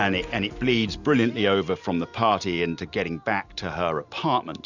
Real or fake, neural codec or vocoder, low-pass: real; none; 7.2 kHz